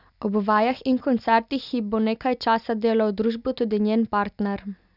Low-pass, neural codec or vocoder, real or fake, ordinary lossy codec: 5.4 kHz; none; real; none